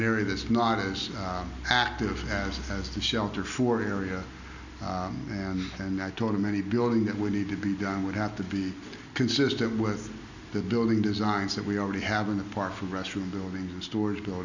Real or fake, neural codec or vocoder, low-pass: real; none; 7.2 kHz